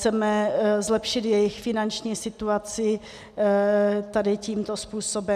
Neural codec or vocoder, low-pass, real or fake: none; 14.4 kHz; real